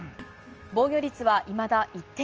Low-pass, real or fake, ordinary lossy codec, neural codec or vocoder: 7.2 kHz; real; Opus, 24 kbps; none